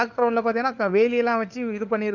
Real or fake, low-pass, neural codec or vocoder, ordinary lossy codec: fake; 7.2 kHz; codec, 16 kHz, 4 kbps, FunCodec, trained on LibriTTS, 50 frames a second; none